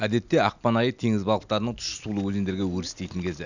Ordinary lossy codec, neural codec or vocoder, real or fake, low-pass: none; none; real; 7.2 kHz